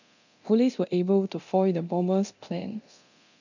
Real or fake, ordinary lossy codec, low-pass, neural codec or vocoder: fake; none; 7.2 kHz; codec, 24 kHz, 0.9 kbps, DualCodec